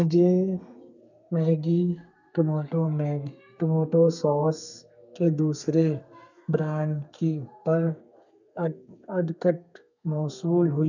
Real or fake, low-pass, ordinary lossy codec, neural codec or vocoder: fake; 7.2 kHz; none; codec, 32 kHz, 1.9 kbps, SNAC